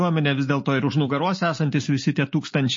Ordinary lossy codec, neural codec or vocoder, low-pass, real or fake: MP3, 32 kbps; none; 7.2 kHz; real